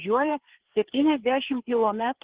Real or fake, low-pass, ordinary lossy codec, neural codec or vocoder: fake; 3.6 kHz; Opus, 16 kbps; codec, 16 kHz, 4 kbps, FreqCodec, larger model